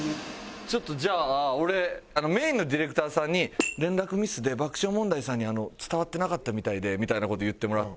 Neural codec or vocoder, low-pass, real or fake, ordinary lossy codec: none; none; real; none